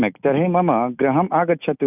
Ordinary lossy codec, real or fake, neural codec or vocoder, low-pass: none; real; none; 3.6 kHz